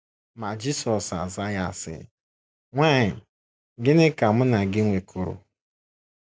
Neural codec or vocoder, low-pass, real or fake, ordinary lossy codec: none; none; real; none